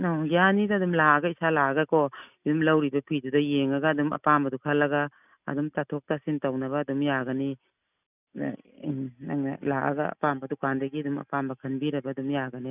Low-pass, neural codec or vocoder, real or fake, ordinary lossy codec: 3.6 kHz; none; real; none